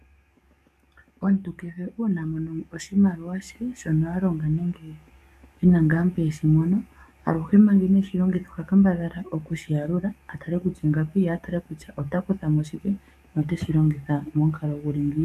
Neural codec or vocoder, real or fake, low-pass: codec, 44.1 kHz, 7.8 kbps, DAC; fake; 14.4 kHz